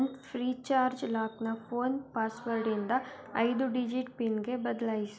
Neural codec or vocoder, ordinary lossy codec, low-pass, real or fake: none; none; none; real